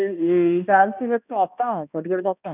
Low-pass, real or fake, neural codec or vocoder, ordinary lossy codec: 3.6 kHz; fake; codec, 16 kHz, 1 kbps, X-Codec, HuBERT features, trained on balanced general audio; none